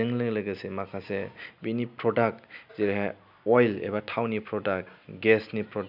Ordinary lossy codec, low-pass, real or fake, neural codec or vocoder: none; 5.4 kHz; real; none